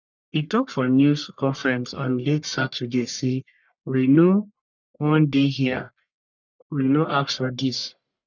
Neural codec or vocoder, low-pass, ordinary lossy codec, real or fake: codec, 44.1 kHz, 1.7 kbps, Pupu-Codec; 7.2 kHz; none; fake